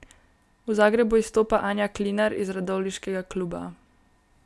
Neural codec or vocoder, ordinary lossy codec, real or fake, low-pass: none; none; real; none